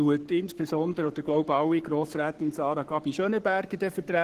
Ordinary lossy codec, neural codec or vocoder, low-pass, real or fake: Opus, 16 kbps; codec, 44.1 kHz, 7.8 kbps, Pupu-Codec; 14.4 kHz; fake